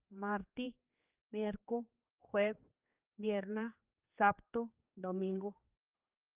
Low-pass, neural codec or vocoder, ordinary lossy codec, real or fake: 3.6 kHz; codec, 16 kHz, 4 kbps, X-Codec, HuBERT features, trained on general audio; Opus, 32 kbps; fake